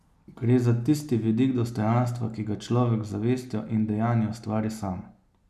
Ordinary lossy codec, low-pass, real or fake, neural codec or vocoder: none; 14.4 kHz; real; none